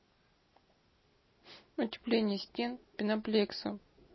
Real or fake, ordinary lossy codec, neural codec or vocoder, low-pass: real; MP3, 24 kbps; none; 7.2 kHz